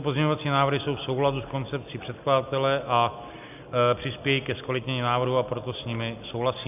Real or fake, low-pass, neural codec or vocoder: real; 3.6 kHz; none